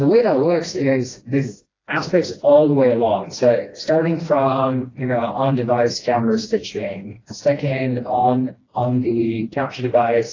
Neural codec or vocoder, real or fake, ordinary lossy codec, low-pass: codec, 16 kHz, 1 kbps, FreqCodec, smaller model; fake; AAC, 32 kbps; 7.2 kHz